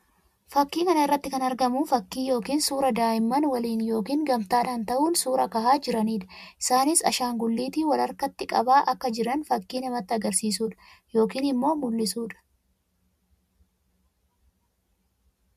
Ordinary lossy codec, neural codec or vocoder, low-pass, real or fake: MP3, 96 kbps; none; 14.4 kHz; real